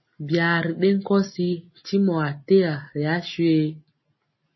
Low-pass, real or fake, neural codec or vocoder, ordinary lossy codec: 7.2 kHz; real; none; MP3, 24 kbps